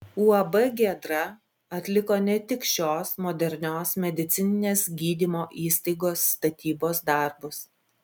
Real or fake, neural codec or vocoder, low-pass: real; none; 19.8 kHz